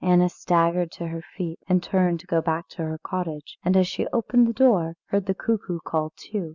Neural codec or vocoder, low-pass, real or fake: none; 7.2 kHz; real